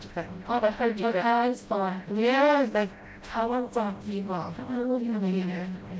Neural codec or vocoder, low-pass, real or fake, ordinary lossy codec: codec, 16 kHz, 0.5 kbps, FreqCodec, smaller model; none; fake; none